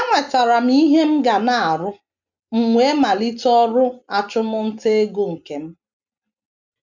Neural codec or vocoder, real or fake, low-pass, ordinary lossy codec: none; real; 7.2 kHz; none